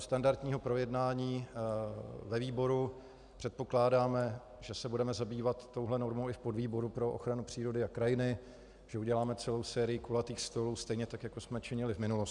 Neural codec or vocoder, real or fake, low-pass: none; real; 10.8 kHz